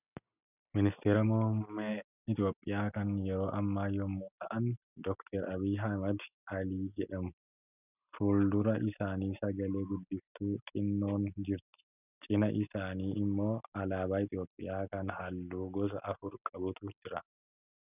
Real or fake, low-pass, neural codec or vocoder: real; 3.6 kHz; none